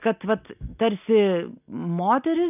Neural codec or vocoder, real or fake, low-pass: none; real; 3.6 kHz